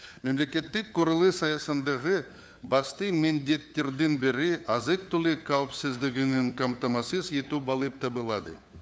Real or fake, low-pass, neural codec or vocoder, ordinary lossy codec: fake; none; codec, 16 kHz, 4 kbps, FunCodec, trained on LibriTTS, 50 frames a second; none